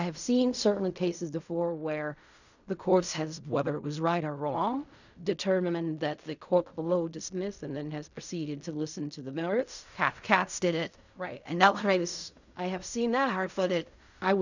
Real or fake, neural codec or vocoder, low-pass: fake; codec, 16 kHz in and 24 kHz out, 0.4 kbps, LongCat-Audio-Codec, fine tuned four codebook decoder; 7.2 kHz